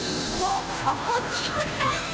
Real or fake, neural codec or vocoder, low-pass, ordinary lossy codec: fake; codec, 16 kHz, 0.5 kbps, FunCodec, trained on Chinese and English, 25 frames a second; none; none